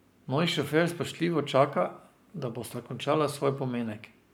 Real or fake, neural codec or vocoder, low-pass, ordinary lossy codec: fake; codec, 44.1 kHz, 7.8 kbps, Pupu-Codec; none; none